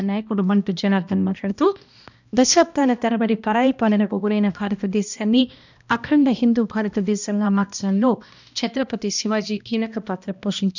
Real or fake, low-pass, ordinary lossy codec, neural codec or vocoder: fake; 7.2 kHz; none; codec, 16 kHz, 1 kbps, X-Codec, HuBERT features, trained on balanced general audio